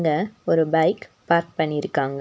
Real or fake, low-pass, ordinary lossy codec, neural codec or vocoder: real; none; none; none